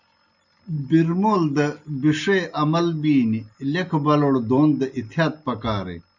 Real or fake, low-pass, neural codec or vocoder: real; 7.2 kHz; none